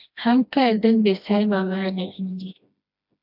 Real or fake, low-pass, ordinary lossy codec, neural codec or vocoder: fake; 5.4 kHz; AAC, 48 kbps; codec, 16 kHz, 1 kbps, FreqCodec, smaller model